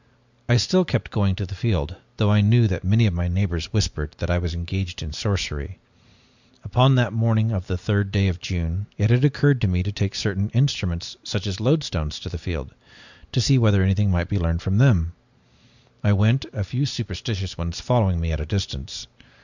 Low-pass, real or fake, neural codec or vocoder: 7.2 kHz; real; none